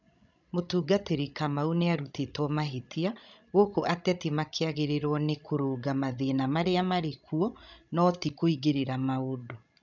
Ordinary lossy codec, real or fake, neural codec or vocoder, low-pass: none; fake; codec, 16 kHz, 16 kbps, FreqCodec, larger model; 7.2 kHz